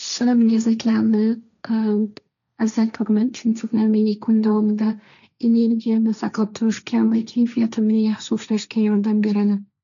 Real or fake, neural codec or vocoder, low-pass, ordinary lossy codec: fake; codec, 16 kHz, 1.1 kbps, Voila-Tokenizer; 7.2 kHz; none